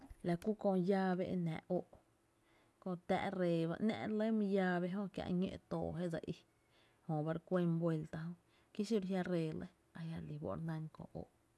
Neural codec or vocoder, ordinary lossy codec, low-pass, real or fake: none; none; none; real